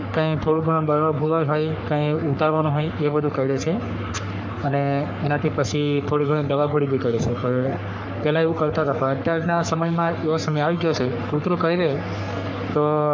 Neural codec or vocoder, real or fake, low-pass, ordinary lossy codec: codec, 44.1 kHz, 3.4 kbps, Pupu-Codec; fake; 7.2 kHz; MP3, 64 kbps